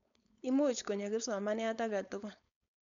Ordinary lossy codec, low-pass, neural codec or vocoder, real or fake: MP3, 96 kbps; 7.2 kHz; codec, 16 kHz, 4.8 kbps, FACodec; fake